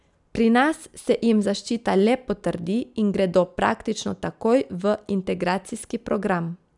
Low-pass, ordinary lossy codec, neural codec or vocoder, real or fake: 10.8 kHz; none; none; real